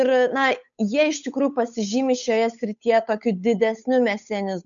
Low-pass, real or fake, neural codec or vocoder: 7.2 kHz; fake; codec, 16 kHz, 8 kbps, FunCodec, trained on Chinese and English, 25 frames a second